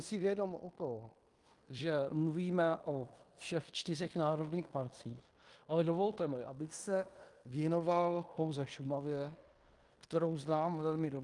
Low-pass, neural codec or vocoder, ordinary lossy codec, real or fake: 10.8 kHz; codec, 16 kHz in and 24 kHz out, 0.9 kbps, LongCat-Audio-Codec, fine tuned four codebook decoder; Opus, 32 kbps; fake